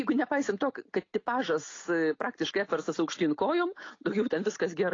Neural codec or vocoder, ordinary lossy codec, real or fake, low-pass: none; AAC, 32 kbps; real; 7.2 kHz